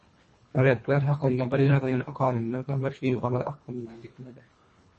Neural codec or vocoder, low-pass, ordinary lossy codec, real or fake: codec, 24 kHz, 1.5 kbps, HILCodec; 10.8 kHz; MP3, 32 kbps; fake